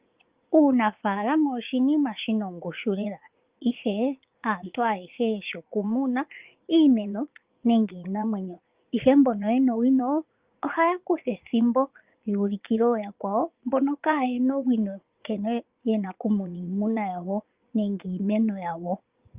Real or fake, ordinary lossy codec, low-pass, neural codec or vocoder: fake; Opus, 24 kbps; 3.6 kHz; vocoder, 44.1 kHz, 80 mel bands, Vocos